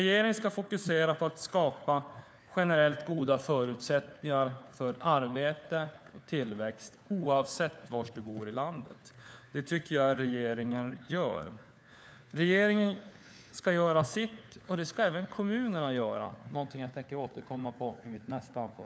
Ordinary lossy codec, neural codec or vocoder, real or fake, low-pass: none; codec, 16 kHz, 4 kbps, FunCodec, trained on LibriTTS, 50 frames a second; fake; none